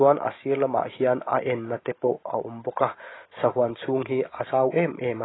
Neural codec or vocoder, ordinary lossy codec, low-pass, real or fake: none; AAC, 16 kbps; 7.2 kHz; real